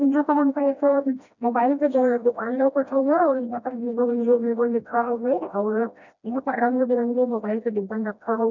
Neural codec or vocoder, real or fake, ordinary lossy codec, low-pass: codec, 16 kHz, 1 kbps, FreqCodec, smaller model; fake; none; 7.2 kHz